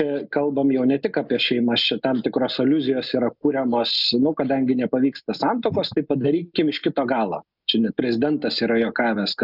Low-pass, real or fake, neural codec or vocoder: 5.4 kHz; real; none